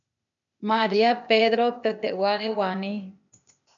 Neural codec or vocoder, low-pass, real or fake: codec, 16 kHz, 0.8 kbps, ZipCodec; 7.2 kHz; fake